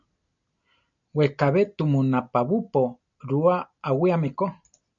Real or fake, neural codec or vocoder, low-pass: real; none; 7.2 kHz